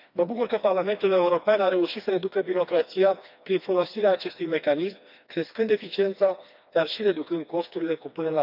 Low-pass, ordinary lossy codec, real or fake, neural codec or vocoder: 5.4 kHz; none; fake; codec, 16 kHz, 2 kbps, FreqCodec, smaller model